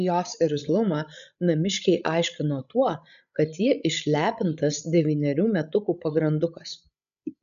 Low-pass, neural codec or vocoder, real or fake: 7.2 kHz; codec, 16 kHz, 16 kbps, FreqCodec, larger model; fake